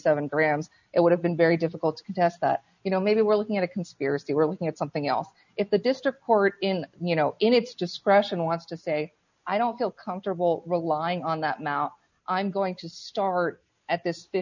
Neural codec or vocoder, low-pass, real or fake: none; 7.2 kHz; real